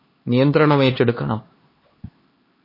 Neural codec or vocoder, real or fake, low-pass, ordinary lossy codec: codec, 16 kHz, 2 kbps, X-Codec, HuBERT features, trained on LibriSpeech; fake; 5.4 kHz; MP3, 24 kbps